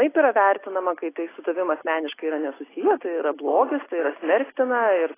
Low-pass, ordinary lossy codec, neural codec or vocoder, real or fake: 3.6 kHz; AAC, 16 kbps; none; real